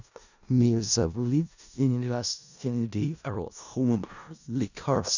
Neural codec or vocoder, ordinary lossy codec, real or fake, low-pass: codec, 16 kHz in and 24 kHz out, 0.4 kbps, LongCat-Audio-Codec, four codebook decoder; none; fake; 7.2 kHz